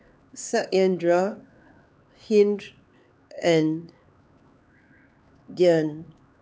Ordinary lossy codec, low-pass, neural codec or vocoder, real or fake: none; none; codec, 16 kHz, 4 kbps, X-Codec, HuBERT features, trained on LibriSpeech; fake